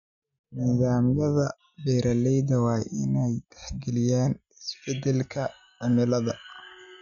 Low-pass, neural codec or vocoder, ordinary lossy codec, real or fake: 7.2 kHz; none; none; real